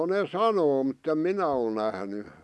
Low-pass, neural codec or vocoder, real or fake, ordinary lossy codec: none; none; real; none